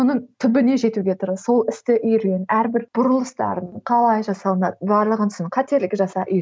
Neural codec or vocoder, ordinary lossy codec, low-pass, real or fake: none; none; none; real